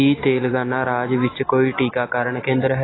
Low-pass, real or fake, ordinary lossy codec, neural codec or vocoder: 7.2 kHz; real; AAC, 16 kbps; none